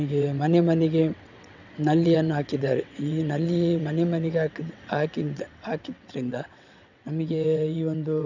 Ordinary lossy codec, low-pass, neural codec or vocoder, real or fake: none; 7.2 kHz; vocoder, 22.05 kHz, 80 mel bands, WaveNeXt; fake